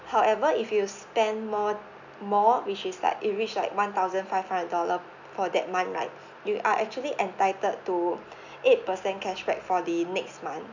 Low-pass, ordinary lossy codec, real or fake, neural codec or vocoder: 7.2 kHz; none; real; none